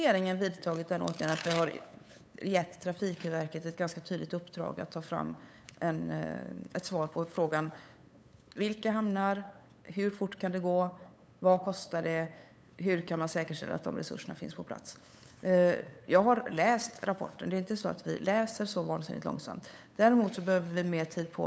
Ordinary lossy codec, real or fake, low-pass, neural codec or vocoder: none; fake; none; codec, 16 kHz, 8 kbps, FunCodec, trained on LibriTTS, 25 frames a second